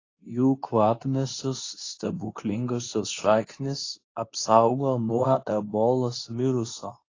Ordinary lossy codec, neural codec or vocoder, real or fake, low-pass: AAC, 32 kbps; codec, 24 kHz, 0.9 kbps, WavTokenizer, medium speech release version 2; fake; 7.2 kHz